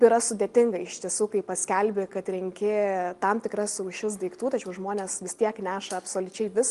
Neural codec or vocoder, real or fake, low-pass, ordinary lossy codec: none; real; 10.8 kHz; Opus, 24 kbps